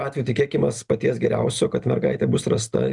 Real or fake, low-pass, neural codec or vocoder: real; 10.8 kHz; none